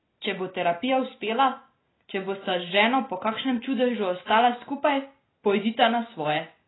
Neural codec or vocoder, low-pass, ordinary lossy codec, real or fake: none; 7.2 kHz; AAC, 16 kbps; real